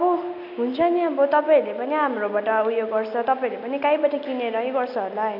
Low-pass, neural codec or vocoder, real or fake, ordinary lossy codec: 5.4 kHz; none; real; none